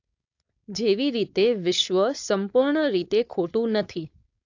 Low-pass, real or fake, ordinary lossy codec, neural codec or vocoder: 7.2 kHz; fake; AAC, 48 kbps; codec, 16 kHz, 4.8 kbps, FACodec